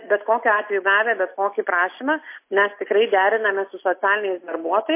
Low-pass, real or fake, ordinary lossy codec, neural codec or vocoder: 3.6 kHz; real; MP3, 24 kbps; none